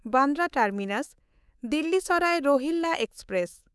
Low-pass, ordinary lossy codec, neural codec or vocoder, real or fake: none; none; codec, 24 kHz, 3.1 kbps, DualCodec; fake